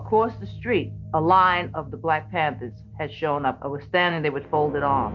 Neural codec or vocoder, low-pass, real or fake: none; 7.2 kHz; real